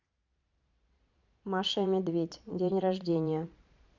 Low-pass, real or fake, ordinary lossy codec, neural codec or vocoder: 7.2 kHz; fake; none; vocoder, 22.05 kHz, 80 mel bands, Vocos